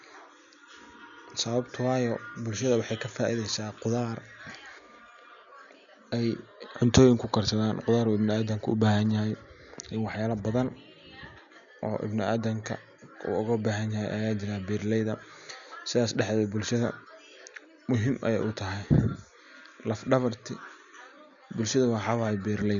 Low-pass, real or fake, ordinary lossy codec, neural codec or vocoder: 7.2 kHz; real; none; none